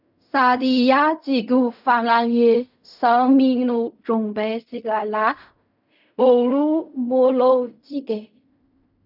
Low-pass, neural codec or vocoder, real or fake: 5.4 kHz; codec, 16 kHz in and 24 kHz out, 0.4 kbps, LongCat-Audio-Codec, fine tuned four codebook decoder; fake